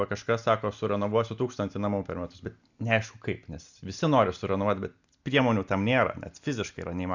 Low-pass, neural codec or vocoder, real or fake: 7.2 kHz; none; real